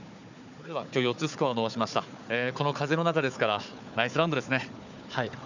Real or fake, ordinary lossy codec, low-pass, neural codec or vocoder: fake; none; 7.2 kHz; codec, 16 kHz, 4 kbps, FunCodec, trained on Chinese and English, 50 frames a second